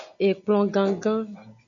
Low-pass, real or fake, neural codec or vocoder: 7.2 kHz; real; none